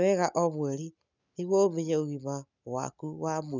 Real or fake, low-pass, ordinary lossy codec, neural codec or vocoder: real; 7.2 kHz; none; none